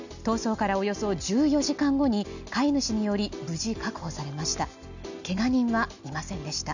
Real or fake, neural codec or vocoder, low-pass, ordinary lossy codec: real; none; 7.2 kHz; none